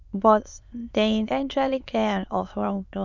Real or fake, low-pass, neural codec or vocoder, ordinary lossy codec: fake; 7.2 kHz; autoencoder, 22.05 kHz, a latent of 192 numbers a frame, VITS, trained on many speakers; none